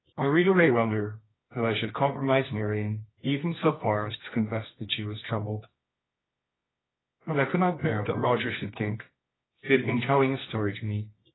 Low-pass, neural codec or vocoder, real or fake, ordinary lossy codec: 7.2 kHz; codec, 24 kHz, 0.9 kbps, WavTokenizer, medium music audio release; fake; AAC, 16 kbps